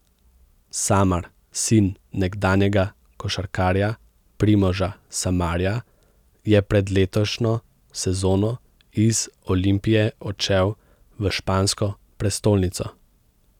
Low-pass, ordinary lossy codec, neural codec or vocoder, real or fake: 19.8 kHz; none; none; real